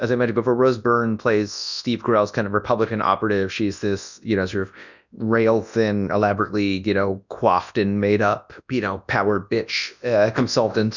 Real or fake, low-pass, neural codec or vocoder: fake; 7.2 kHz; codec, 24 kHz, 0.9 kbps, WavTokenizer, large speech release